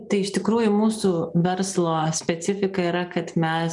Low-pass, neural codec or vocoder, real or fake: 10.8 kHz; none; real